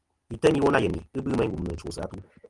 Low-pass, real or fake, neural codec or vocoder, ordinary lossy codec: 10.8 kHz; real; none; Opus, 32 kbps